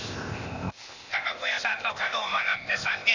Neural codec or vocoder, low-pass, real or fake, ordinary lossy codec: codec, 16 kHz, 0.8 kbps, ZipCodec; 7.2 kHz; fake; none